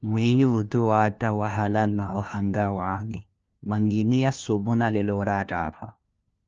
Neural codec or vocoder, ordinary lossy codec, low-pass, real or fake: codec, 16 kHz, 1 kbps, FunCodec, trained on LibriTTS, 50 frames a second; Opus, 24 kbps; 7.2 kHz; fake